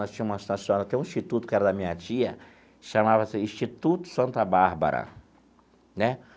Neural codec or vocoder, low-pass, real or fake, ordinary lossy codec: none; none; real; none